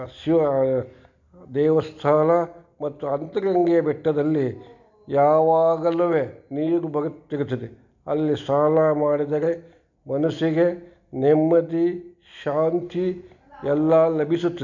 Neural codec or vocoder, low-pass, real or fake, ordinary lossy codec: none; 7.2 kHz; real; none